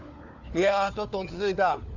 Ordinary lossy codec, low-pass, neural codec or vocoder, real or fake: none; 7.2 kHz; codec, 16 kHz, 4 kbps, FunCodec, trained on LibriTTS, 50 frames a second; fake